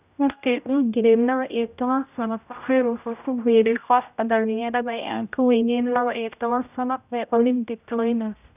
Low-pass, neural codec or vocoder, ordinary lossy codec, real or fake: 3.6 kHz; codec, 16 kHz, 0.5 kbps, X-Codec, HuBERT features, trained on general audio; none; fake